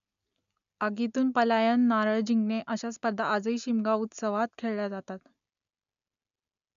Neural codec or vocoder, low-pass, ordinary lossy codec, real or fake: none; 7.2 kHz; none; real